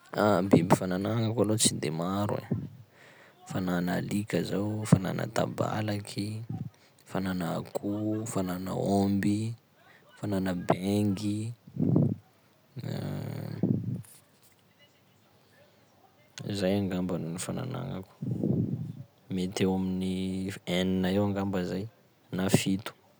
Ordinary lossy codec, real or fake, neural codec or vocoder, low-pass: none; real; none; none